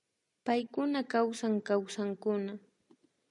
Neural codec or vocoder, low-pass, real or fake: none; 10.8 kHz; real